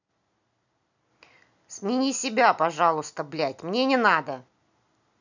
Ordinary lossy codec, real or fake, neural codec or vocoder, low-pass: none; real; none; 7.2 kHz